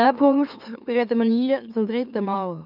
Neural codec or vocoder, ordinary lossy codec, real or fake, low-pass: autoencoder, 44.1 kHz, a latent of 192 numbers a frame, MeloTTS; none; fake; 5.4 kHz